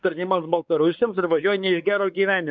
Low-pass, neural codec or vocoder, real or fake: 7.2 kHz; codec, 16 kHz, 4 kbps, X-Codec, WavLM features, trained on Multilingual LibriSpeech; fake